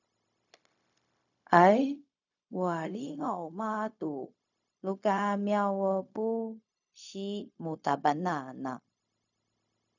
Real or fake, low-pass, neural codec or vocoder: fake; 7.2 kHz; codec, 16 kHz, 0.4 kbps, LongCat-Audio-Codec